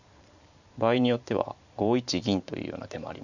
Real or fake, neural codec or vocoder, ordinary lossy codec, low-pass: fake; vocoder, 44.1 kHz, 128 mel bands every 512 samples, BigVGAN v2; none; 7.2 kHz